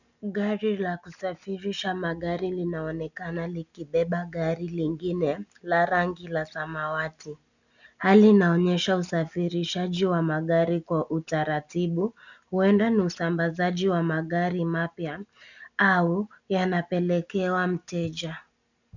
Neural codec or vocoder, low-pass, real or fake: none; 7.2 kHz; real